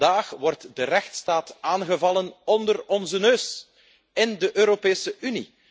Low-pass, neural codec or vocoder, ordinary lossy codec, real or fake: none; none; none; real